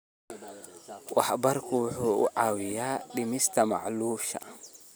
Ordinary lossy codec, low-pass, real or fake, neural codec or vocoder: none; none; real; none